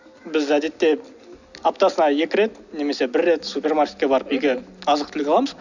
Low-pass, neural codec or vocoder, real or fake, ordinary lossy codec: 7.2 kHz; none; real; none